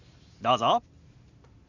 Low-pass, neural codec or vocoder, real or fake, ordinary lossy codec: 7.2 kHz; none; real; none